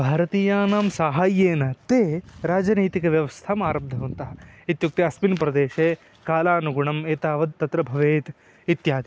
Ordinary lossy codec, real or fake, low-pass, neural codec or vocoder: none; real; none; none